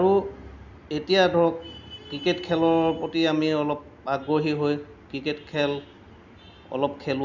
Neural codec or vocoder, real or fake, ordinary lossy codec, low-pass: none; real; none; 7.2 kHz